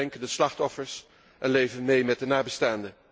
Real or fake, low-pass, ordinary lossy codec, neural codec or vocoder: real; none; none; none